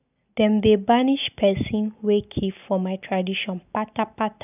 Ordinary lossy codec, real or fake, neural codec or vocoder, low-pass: none; real; none; 3.6 kHz